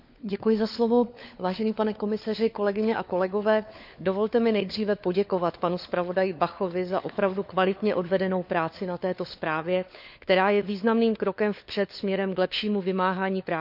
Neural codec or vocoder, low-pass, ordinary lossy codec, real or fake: codec, 16 kHz, 4 kbps, FunCodec, trained on LibriTTS, 50 frames a second; 5.4 kHz; none; fake